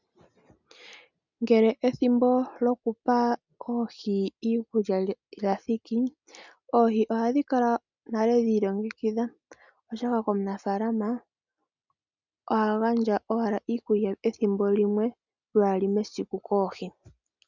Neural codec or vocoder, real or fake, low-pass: none; real; 7.2 kHz